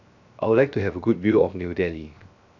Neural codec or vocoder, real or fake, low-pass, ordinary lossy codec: codec, 16 kHz, 0.7 kbps, FocalCodec; fake; 7.2 kHz; none